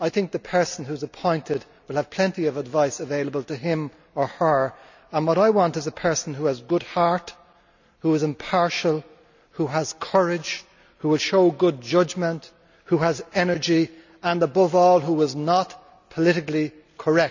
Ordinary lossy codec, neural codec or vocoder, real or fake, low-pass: none; none; real; 7.2 kHz